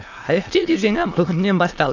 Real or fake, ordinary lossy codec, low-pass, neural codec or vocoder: fake; AAC, 48 kbps; 7.2 kHz; autoencoder, 22.05 kHz, a latent of 192 numbers a frame, VITS, trained on many speakers